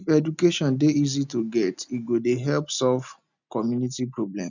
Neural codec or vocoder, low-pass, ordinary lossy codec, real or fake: none; 7.2 kHz; none; real